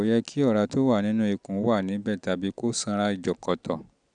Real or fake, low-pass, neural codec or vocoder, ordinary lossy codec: real; 9.9 kHz; none; none